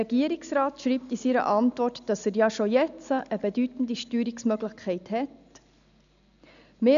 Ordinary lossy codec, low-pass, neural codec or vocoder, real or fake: none; 7.2 kHz; none; real